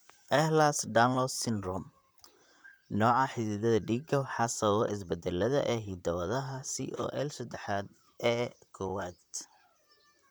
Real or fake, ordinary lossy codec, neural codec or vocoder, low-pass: fake; none; vocoder, 44.1 kHz, 128 mel bands, Pupu-Vocoder; none